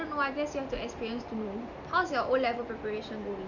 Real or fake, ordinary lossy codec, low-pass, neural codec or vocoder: real; none; 7.2 kHz; none